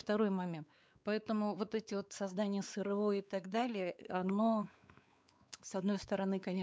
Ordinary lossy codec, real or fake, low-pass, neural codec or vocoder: none; fake; none; codec, 16 kHz, 4 kbps, X-Codec, WavLM features, trained on Multilingual LibriSpeech